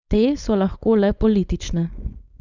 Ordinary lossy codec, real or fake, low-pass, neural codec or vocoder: none; fake; 7.2 kHz; codec, 16 kHz, 4.8 kbps, FACodec